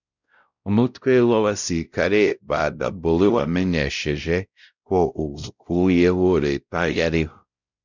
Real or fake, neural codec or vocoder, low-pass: fake; codec, 16 kHz, 0.5 kbps, X-Codec, WavLM features, trained on Multilingual LibriSpeech; 7.2 kHz